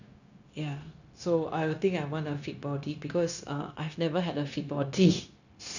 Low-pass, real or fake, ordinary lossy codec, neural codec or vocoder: 7.2 kHz; fake; none; codec, 16 kHz, 0.9 kbps, LongCat-Audio-Codec